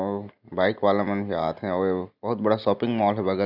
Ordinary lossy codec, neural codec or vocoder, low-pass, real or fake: none; none; 5.4 kHz; real